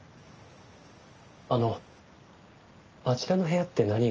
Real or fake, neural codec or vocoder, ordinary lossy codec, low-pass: real; none; Opus, 24 kbps; 7.2 kHz